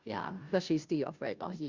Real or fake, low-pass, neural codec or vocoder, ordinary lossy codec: fake; 7.2 kHz; codec, 16 kHz, 0.5 kbps, FunCodec, trained on Chinese and English, 25 frames a second; Opus, 64 kbps